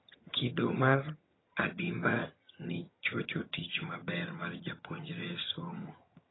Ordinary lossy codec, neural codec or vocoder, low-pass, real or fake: AAC, 16 kbps; vocoder, 22.05 kHz, 80 mel bands, HiFi-GAN; 7.2 kHz; fake